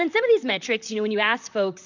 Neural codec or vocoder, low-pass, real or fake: vocoder, 44.1 kHz, 128 mel bands, Pupu-Vocoder; 7.2 kHz; fake